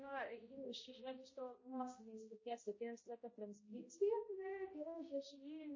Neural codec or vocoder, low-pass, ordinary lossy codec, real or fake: codec, 16 kHz, 0.5 kbps, X-Codec, HuBERT features, trained on balanced general audio; 7.2 kHz; MP3, 32 kbps; fake